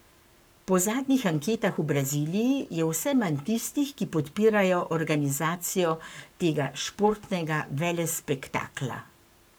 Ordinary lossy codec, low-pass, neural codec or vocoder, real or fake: none; none; codec, 44.1 kHz, 7.8 kbps, Pupu-Codec; fake